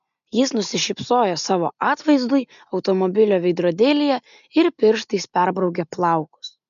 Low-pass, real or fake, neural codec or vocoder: 7.2 kHz; real; none